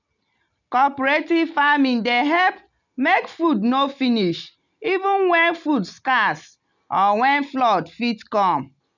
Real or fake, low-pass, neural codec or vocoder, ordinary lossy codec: real; 7.2 kHz; none; none